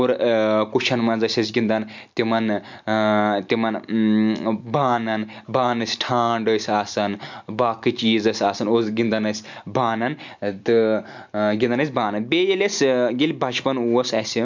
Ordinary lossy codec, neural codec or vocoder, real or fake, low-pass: MP3, 64 kbps; none; real; 7.2 kHz